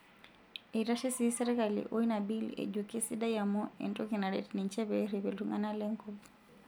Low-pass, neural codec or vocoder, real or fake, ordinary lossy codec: none; none; real; none